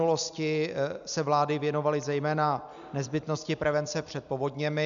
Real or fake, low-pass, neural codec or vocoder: real; 7.2 kHz; none